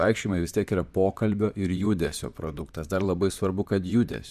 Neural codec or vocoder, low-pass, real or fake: vocoder, 44.1 kHz, 128 mel bands, Pupu-Vocoder; 14.4 kHz; fake